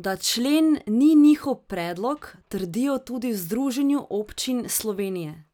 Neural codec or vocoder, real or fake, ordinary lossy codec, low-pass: none; real; none; none